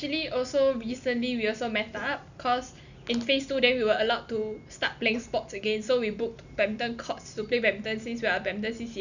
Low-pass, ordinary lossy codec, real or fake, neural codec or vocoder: 7.2 kHz; none; real; none